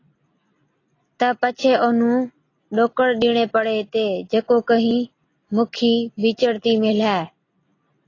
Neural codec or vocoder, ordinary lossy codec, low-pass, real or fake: none; AAC, 32 kbps; 7.2 kHz; real